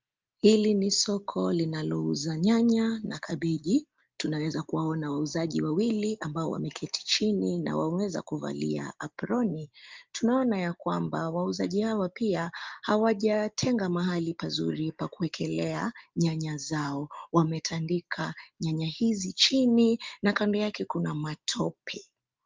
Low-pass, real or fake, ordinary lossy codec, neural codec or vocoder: 7.2 kHz; real; Opus, 32 kbps; none